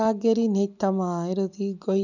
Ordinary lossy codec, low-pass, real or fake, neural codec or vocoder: none; 7.2 kHz; real; none